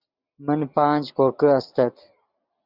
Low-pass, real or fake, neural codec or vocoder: 5.4 kHz; real; none